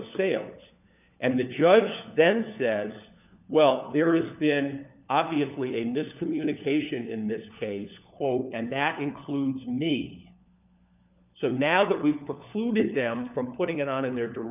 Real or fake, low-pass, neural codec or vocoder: fake; 3.6 kHz; codec, 16 kHz, 4 kbps, FunCodec, trained on LibriTTS, 50 frames a second